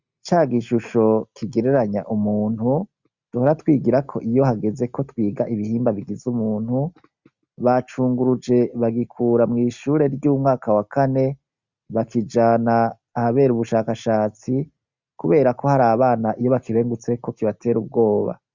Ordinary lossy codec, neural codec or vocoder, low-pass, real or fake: Opus, 64 kbps; none; 7.2 kHz; real